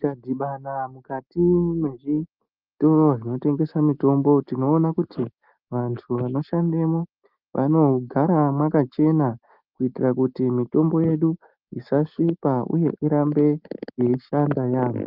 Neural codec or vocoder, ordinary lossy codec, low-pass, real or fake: none; Opus, 24 kbps; 5.4 kHz; real